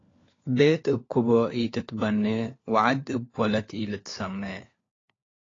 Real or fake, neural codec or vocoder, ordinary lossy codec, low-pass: fake; codec, 16 kHz, 4 kbps, FunCodec, trained on LibriTTS, 50 frames a second; AAC, 32 kbps; 7.2 kHz